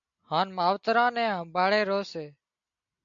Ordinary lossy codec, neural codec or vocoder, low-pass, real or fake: MP3, 64 kbps; none; 7.2 kHz; real